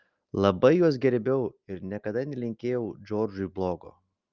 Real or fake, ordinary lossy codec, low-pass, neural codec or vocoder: real; Opus, 24 kbps; 7.2 kHz; none